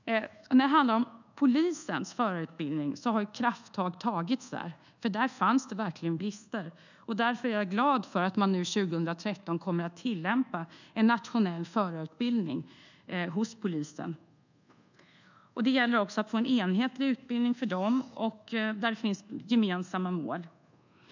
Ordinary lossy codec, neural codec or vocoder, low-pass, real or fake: none; codec, 24 kHz, 1.2 kbps, DualCodec; 7.2 kHz; fake